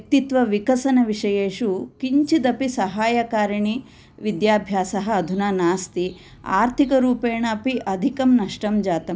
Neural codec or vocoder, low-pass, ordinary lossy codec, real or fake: none; none; none; real